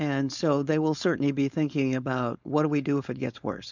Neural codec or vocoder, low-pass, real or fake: codec, 16 kHz, 4.8 kbps, FACodec; 7.2 kHz; fake